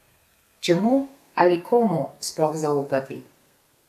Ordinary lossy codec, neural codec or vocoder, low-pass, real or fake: none; codec, 32 kHz, 1.9 kbps, SNAC; 14.4 kHz; fake